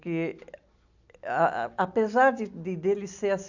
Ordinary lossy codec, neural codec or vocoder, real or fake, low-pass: AAC, 48 kbps; none; real; 7.2 kHz